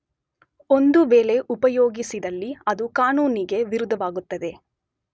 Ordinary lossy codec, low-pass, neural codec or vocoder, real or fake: none; none; none; real